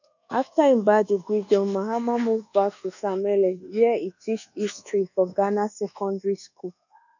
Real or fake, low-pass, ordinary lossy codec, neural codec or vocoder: fake; 7.2 kHz; none; codec, 24 kHz, 1.2 kbps, DualCodec